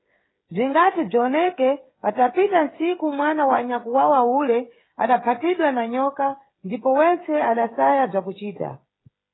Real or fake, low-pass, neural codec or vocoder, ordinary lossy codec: fake; 7.2 kHz; codec, 16 kHz, 8 kbps, FreqCodec, smaller model; AAC, 16 kbps